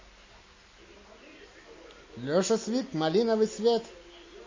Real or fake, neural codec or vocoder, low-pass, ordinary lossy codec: real; none; 7.2 kHz; MP3, 32 kbps